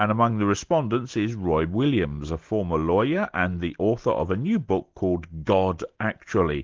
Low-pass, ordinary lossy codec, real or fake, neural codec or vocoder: 7.2 kHz; Opus, 16 kbps; real; none